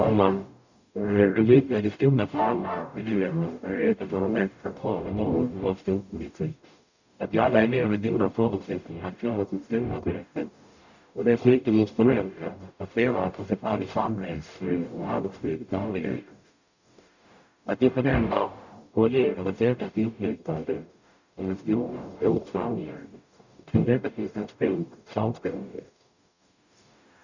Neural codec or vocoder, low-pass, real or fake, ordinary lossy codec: codec, 44.1 kHz, 0.9 kbps, DAC; 7.2 kHz; fake; none